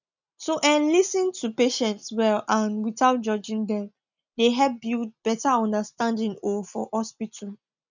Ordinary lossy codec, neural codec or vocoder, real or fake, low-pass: none; none; real; 7.2 kHz